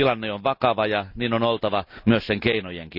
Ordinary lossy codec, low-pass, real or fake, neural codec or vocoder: none; 5.4 kHz; real; none